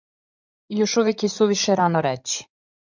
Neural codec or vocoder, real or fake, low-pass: codec, 16 kHz in and 24 kHz out, 2.2 kbps, FireRedTTS-2 codec; fake; 7.2 kHz